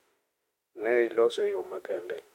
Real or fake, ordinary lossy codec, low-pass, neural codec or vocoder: fake; MP3, 64 kbps; 19.8 kHz; autoencoder, 48 kHz, 32 numbers a frame, DAC-VAE, trained on Japanese speech